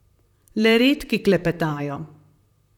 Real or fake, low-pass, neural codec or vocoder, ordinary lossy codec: fake; 19.8 kHz; vocoder, 44.1 kHz, 128 mel bands, Pupu-Vocoder; none